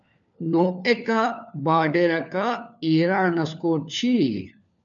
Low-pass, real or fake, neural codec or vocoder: 7.2 kHz; fake; codec, 16 kHz, 4 kbps, FunCodec, trained on LibriTTS, 50 frames a second